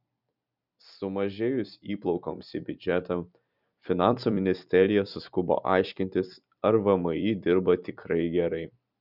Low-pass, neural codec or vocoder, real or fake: 5.4 kHz; vocoder, 44.1 kHz, 128 mel bands every 256 samples, BigVGAN v2; fake